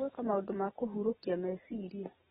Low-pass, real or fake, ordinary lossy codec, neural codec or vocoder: 10.8 kHz; real; AAC, 16 kbps; none